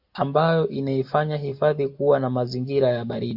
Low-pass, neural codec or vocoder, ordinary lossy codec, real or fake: 5.4 kHz; none; AAC, 48 kbps; real